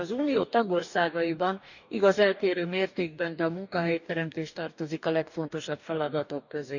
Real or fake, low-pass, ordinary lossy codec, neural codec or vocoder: fake; 7.2 kHz; none; codec, 44.1 kHz, 2.6 kbps, DAC